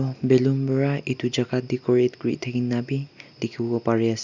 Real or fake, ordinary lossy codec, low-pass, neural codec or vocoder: real; none; 7.2 kHz; none